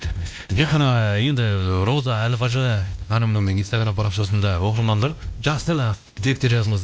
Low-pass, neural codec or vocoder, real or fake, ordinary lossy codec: none; codec, 16 kHz, 1 kbps, X-Codec, WavLM features, trained on Multilingual LibriSpeech; fake; none